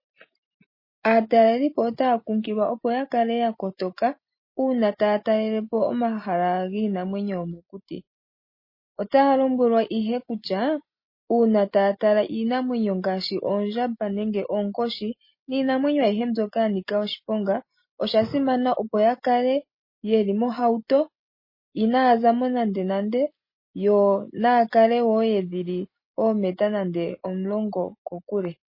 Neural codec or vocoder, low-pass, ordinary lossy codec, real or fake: none; 5.4 kHz; MP3, 24 kbps; real